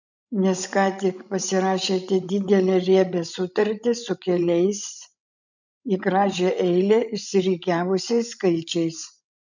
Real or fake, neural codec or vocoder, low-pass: fake; codec, 16 kHz, 16 kbps, FreqCodec, larger model; 7.2 kHz